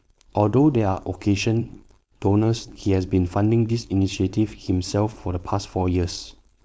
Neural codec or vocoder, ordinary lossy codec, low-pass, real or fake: codec, 16 kHz, 4.8 kbps, FACodec; none; none; fake